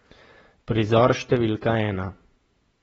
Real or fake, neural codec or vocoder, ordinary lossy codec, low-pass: fake; vocoder, 48 kHz, 128 mel bands, Vocos; AAC, 24 kbps; 19.8 kHz